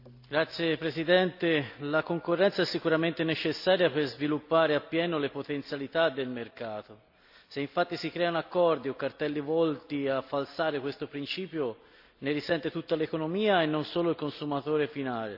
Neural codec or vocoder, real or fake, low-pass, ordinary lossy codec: none; real; 5.4 kHz; AAC, 48 kbps